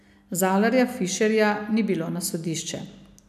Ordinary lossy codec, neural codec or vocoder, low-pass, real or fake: none; none; 14.4 kHz; real